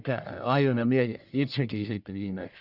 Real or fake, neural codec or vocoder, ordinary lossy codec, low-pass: fake; codec, 44.1 kHz, 1.7 kbps, Pupu-Codec; none; 5.4 kHz